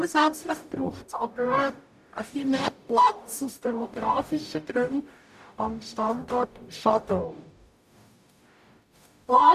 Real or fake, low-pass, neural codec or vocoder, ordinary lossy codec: fake; 14.4 kHz; codec, 44.1 kHz, 0.9 kbps, DAC; none